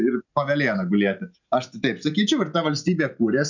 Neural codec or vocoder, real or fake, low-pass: none; real; 7.2 kHz